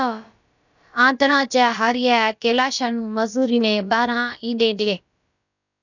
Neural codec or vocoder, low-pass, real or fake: codec, 16 kHz, about 1 kbps, DyCAST, with the encoder's durations; 7.2 kHz; fake